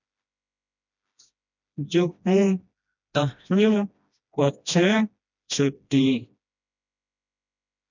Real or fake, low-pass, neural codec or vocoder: fake; 7.2 kHz; codec, 16 kHz, 1 kbps, FreqCodec, smaller model